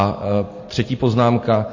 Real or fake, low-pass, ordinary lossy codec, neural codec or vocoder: real; 7.2 kHz; MP3, 32 kbps; none